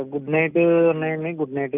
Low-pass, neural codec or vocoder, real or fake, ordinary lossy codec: 3.6 kHz; none; real; none